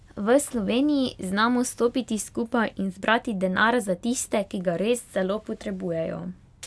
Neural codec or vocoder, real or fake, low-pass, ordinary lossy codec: none; real; none; none